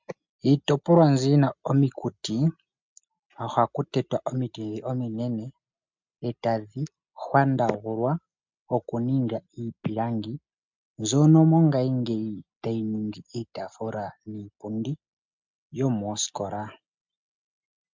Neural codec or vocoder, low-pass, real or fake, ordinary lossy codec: none; 7.2 kHz; real; MP3, 64 kbps